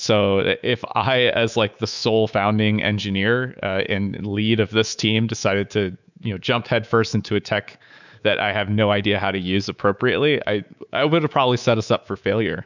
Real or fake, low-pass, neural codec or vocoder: fake; 7.2 kHz; codec, 24 kHz, 3.1 kbps, DualCodec